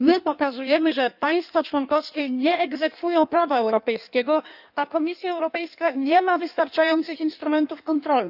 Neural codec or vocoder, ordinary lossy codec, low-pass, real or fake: codec, 16 kHz in and 24 kHz out, 1.1 kbps, FireRedTTS-2 codec; none; 5.4 kHz; fake